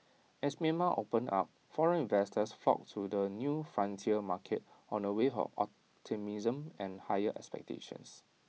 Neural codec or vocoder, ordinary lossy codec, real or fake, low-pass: none; none; real; none